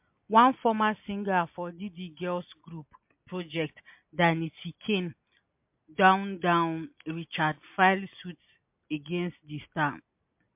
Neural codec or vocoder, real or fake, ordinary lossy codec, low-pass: none; real; MP3, 32 kbps; 3.6 kHz